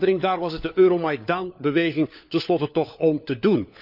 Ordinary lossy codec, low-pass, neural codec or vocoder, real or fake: none; 5.4 kHz; codec, 16 kHz, 4 kbps, FunCodec, trained on LibriTTS, 50 frames a second; fake